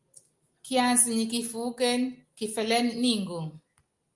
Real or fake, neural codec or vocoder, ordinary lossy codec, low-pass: real; none; Opus, 32 kbps; 10.8 kHz